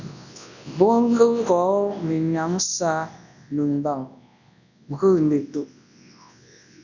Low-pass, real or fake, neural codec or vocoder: 7.2 kHz; fake; codec, 24 kHz, 0.9 kbps, WavTokenizer, large speech release